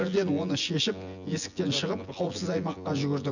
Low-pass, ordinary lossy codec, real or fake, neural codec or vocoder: 7.2 kHz; none; fake; vocoder, 24 kHz, 100 mel bands, Vocos